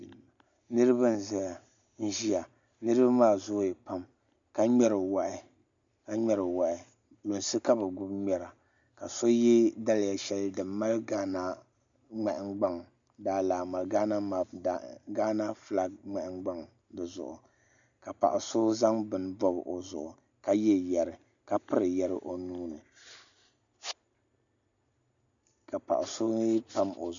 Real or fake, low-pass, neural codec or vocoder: real; 7.2 kHz; none